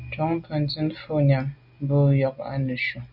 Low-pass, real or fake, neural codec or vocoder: 5.4 kHz; real; none